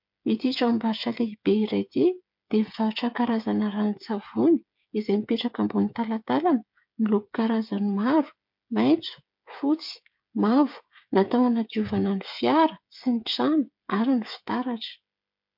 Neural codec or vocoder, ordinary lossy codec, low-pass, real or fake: codec, 16 kHz, 8 kbps, FreqCodec, smaller model; MP3, 48 kbps; 5.4 kHz; fake